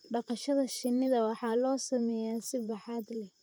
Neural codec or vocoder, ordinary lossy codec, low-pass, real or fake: vocoder, 44.1 kHz, 128 mel bands, Pupu-Vocoder; none; none; fake